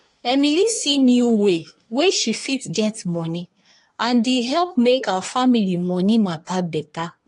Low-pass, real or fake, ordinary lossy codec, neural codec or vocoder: 10.8 kHz; fake; AAC, 48 kbps; codec, 24 kHz, 1 kbps, SNAC